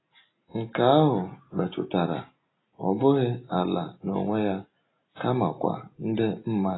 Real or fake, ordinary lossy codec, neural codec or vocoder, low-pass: real; AAC, 16 kbps; none; 7.2 kHz